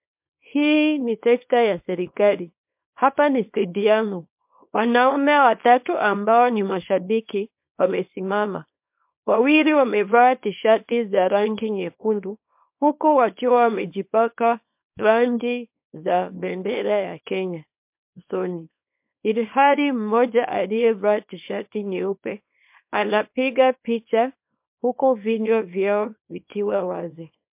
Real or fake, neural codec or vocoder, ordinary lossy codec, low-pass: fake; codec, 24 kHz, 0.9 kbps, WavTokenizer, small release; MP3, 32 kbps; 3.6 kHz